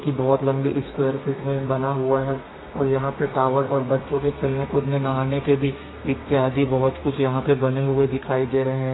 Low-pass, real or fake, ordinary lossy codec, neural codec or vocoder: 7.2 kHz; fake; AAC, 16 kbps; codec, 32 kHz, 1.9 kbps, SNAC